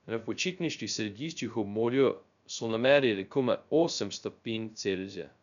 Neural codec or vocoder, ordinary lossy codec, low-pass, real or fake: codec, 16 kHz, 0.2 kbps, FocalCodec; none; 7.2 kHz; fake